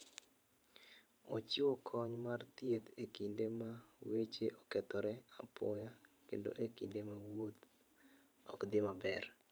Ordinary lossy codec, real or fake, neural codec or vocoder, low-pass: none; fake; vocoder, 44.1 kHz, 128 mel bands every 512 samples, BigVGAN v2; none